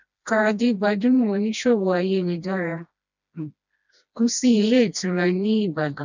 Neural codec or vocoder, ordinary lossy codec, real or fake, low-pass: codec, 16 kHz, 1 kbps, FreqCodec, smaller model; none; fake; 7.2 kHz